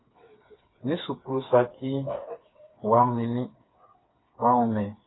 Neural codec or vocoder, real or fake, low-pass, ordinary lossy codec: codec, 16 kHz, 4 kbps, FreqCodec, smaller model; fake; 7.2 kHz; AAC, 16 kbps